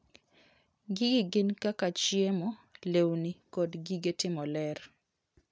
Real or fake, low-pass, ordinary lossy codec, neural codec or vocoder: real; none; none; none